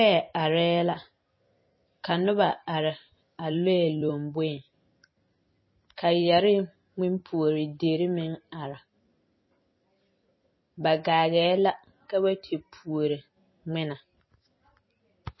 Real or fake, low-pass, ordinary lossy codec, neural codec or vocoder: fake; 7.2 kHz; MP3, 24 kbps; vocoder, 44.1 kHz, 128 mel bands every 256 samples, BigVGAN v2